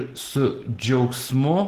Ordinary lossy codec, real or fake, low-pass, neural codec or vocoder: Opus, 16 kbps; real; 14.4 kHz; none